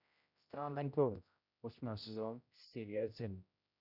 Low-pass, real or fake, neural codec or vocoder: 5.4 kHz; fake; codec, 16 kHz, 0.5 kbps, X-Codec, HuBERT features, trained on general audio